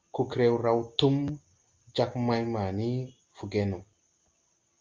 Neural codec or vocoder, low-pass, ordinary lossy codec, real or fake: none; 7.2 kHz; Opus, 32 kbps; real